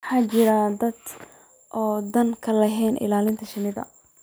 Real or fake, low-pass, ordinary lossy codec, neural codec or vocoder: real; none; none; none